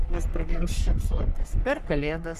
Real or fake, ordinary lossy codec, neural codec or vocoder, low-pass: fake; Opus, 64 kbps; codec, 44.1 kHz, 3.4 kbps, Pupu-Codec; 14.4 kHz